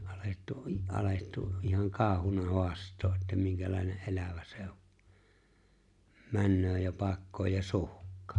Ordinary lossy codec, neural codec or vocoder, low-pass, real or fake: none; none; 10.8 kHz; real